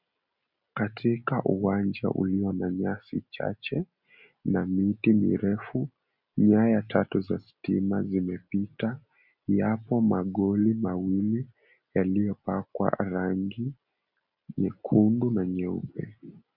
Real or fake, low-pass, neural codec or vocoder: real; 5.4 kHz; none